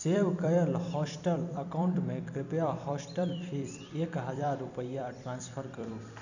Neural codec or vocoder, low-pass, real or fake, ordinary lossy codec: vocoder, 44.1 kHz, 128 mel bands every 256 samples, BigVGAN v2; 7.2 kHz; fake; none